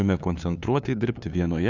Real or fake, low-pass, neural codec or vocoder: fake; 7.2 kHz; codec, 16 kHz, 2 kbps, FunCodec, trained on LibriTTS, 25 frames a second